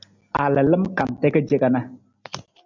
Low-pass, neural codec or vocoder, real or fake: 7.2 kHz; none; real